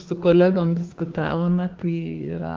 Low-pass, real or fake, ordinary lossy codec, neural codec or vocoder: 7.2 kHz; fake; Opus, 32 kbps; codec, 24 kHz, 1 kbps, SNAC